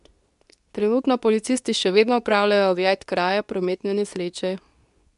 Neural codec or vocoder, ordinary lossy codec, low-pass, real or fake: codec, 24 kHz, 0.9 kbps, WavTokenizer, medium speech release version 2; none; 10.8 kHz; fake